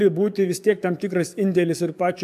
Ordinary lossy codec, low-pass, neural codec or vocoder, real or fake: AAC, 96 kbps; 14.4 kHz; codec, 44.1 kHz, 7.8 kbps, DAC; fake